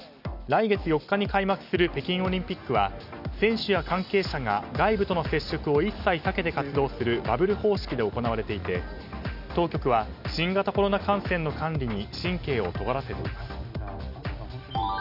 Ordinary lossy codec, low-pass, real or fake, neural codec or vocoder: none; 5.4 kHz; real; none